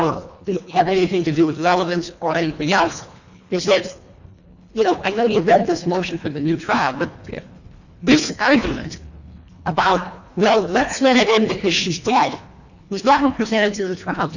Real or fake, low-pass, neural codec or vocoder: fake; 7.2 kHz; codec, 24 kHz, 1.5 kbps, HILCodec